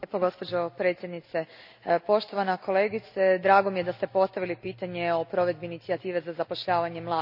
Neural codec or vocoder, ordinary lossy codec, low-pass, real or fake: none; none; 5.4 kHz; real